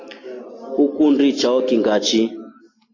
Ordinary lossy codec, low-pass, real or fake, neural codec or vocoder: AAC, 32 kbps; 7.2 kHz; real; none